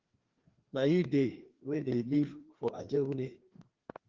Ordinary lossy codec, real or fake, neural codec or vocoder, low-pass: Opus, 16 kbps; fake; codec, 16 kHz, 2 kbps, FreqCodec, larger model; 7.2 kHz